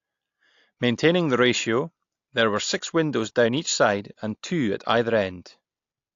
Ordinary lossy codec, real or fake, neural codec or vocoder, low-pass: AAC, 48 kbps; real; none; 7.2 kHz